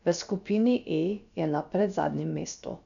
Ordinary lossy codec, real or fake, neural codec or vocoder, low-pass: none; fake; codec, 16 kHz, about 1 kbps, DyCAST, with the encoder's durations; 7.2 kHz